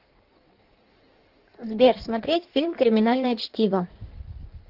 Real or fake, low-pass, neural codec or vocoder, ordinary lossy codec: fake; 5.4 kHz; codec, 16 kHz in and 24 kHz out, 1.1 kbps, FireRedTTS-2 codec; Opus, 16 kbps